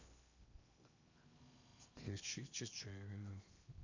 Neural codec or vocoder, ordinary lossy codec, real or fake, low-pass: codec, 16 kHz in and 24 kHz out, 0.8 kbps, FocalCodec, streaming, 65536 codes; none; fake; 7.2 kHz